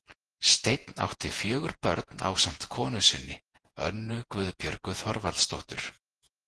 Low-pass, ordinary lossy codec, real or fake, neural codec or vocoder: 10.8 kHz; Opus, 16 kbps; fake; vocoder, 48 kHz, 128 mel bands, Vocos